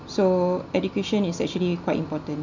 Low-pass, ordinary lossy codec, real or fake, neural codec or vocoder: 7.2 kHz; none; real; none